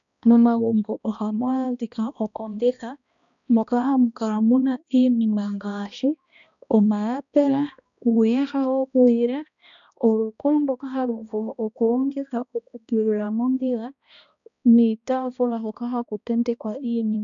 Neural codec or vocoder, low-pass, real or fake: codec, 16 kHz, 1 kbps, X-Codec, HuBERT features, trained on balanced general audio; 7.2 kHz; fake